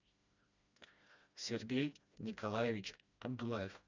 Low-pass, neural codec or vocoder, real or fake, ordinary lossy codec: 7.2 kHz; codec, 16 kHz, 1 kbps, FreqCodec, smaller model; fake; Opus, 64 kbps